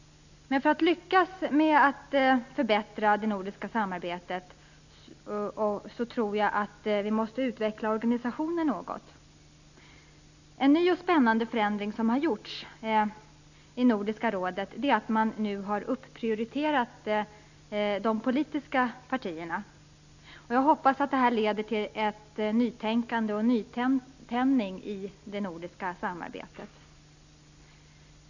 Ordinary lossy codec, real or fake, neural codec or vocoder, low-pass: none; real; none; 7.2 kHz